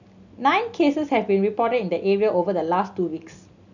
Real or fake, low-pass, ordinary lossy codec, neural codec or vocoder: real; 7.2 kHz; none; none